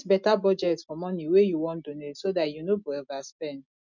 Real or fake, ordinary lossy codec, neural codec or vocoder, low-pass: real; none; none; 7.2 kHz